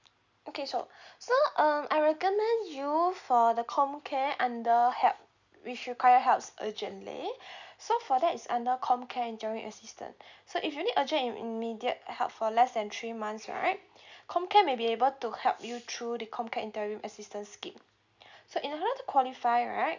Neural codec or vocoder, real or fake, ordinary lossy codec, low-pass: none; real; none; 7.2 kHz